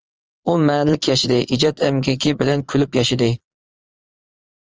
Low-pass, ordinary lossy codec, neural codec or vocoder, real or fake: 7.2 kHz; Opus, 24 kbps; vocoder, 22.05 kHz, 80 mel bands, Vocos; fake